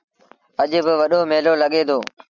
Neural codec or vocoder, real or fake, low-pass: none; real; 7.2 kHz